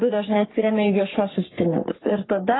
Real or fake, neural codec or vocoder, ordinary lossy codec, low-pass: fake; codec, 44.1 kHz, 3.4 kbps, Pupu-Codec; AAC, 16 kbps; 7.2 kHz